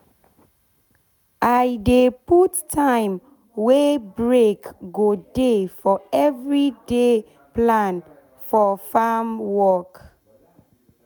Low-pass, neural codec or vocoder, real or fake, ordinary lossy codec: none; none; real; none